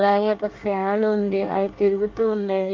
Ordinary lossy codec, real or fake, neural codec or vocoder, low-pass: Opus, 32 kbps; fake; codec, 24 kHz, 1 kbps, SNAC; 7.2 kHz